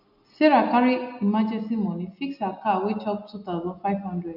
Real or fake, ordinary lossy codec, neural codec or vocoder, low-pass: real; none; none; 5.4 kHz